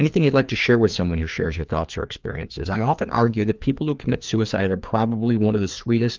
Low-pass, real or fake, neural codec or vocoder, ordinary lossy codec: 7.2 kHz; fake; codec, 16 kHz, 2 kbps, FreqCodec, larger model; Opus, 24 kbps